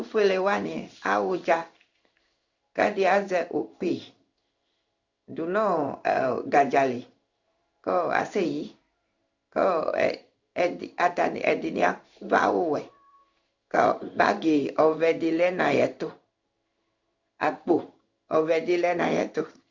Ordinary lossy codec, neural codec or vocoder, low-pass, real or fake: Opus, 64 kbps; codec, 16 kHz in and 24 kHz out, 1 kbps, XY-Tokenizer; 7.2 kHz; fake